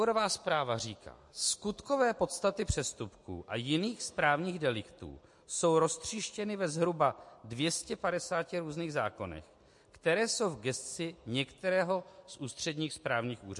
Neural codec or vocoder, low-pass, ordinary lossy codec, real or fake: none; 10.8 kHz; MP3, 48 kbps; real